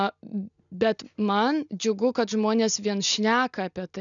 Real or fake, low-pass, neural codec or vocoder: real; 7.2 kHz; none